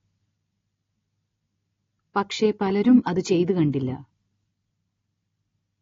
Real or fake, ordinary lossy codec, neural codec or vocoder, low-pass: real; AAC, 32 kbps; none; 7.2 kHz